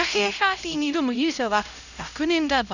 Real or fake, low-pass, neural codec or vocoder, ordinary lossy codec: fake; 7.2 kHz; codec, 16 kHz, 0.5 kbps, X-Codec, HuBERT features, trained on LibriSpeech; none